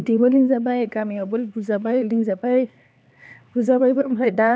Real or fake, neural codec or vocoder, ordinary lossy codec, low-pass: fake; codec, 16 kHz, 4 kbps, X-Codec, HuBERT features, trained on LibriSpeech; none; none